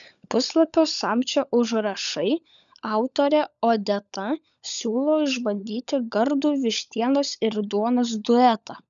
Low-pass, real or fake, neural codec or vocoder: 7.2 kHz; fake; codec, 16 kHz, 16 kbps, FunCodec, trained on LibriTTS, 50 frames a second